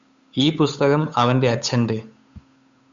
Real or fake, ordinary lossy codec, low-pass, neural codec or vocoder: fake; Opus, 64 kbps; 7.2 kHz; codec, 16 kHz, 8 kbps, FunCodec, trained on Chinese and English, 25 frames a second